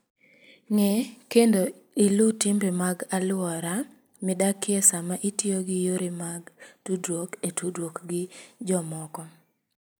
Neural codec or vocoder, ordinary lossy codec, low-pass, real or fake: none; none; none; real